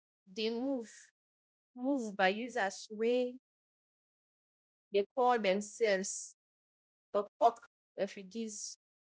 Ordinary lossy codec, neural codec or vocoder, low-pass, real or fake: none; codec, 16 kHz, 0.5 kbps, X-Codec, HuBERT features, trained on balanced general audio; none; fake